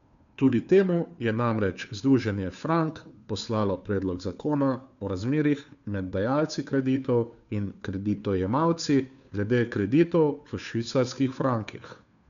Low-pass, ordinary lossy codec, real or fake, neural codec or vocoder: 7.2 kHz; MP3, 96 kbps; fake; codec, 16 kHz, 2 kbps, FunCodec, trained on Chinese and English, 25 frames a second